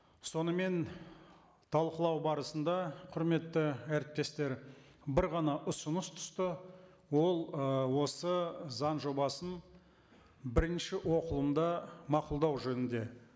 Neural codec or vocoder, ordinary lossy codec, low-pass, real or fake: none; none; none; real